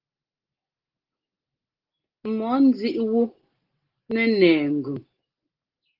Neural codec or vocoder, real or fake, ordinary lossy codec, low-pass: none; real; Opus, 16 kbps; 5.4 kHz